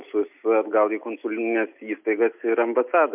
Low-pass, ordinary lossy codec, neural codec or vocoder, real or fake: 3.6 kHz; MP3, 32 kbps; none; real